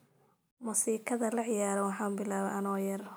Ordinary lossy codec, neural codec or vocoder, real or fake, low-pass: none; none; real; none